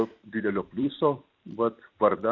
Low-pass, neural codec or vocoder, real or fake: 7.2 kHz; vocoder, 44.1 kHz, 128 mel bands every 256 samples, BigVGAN v2; fake